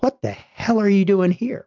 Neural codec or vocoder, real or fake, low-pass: none; real; 7.2 kHz